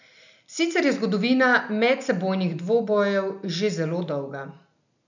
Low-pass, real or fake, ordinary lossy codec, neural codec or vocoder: 7.2 kHz; real; none; none